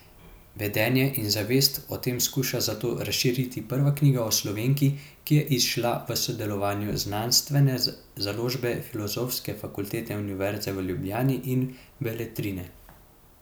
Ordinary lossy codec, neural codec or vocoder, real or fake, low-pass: none; none; real; none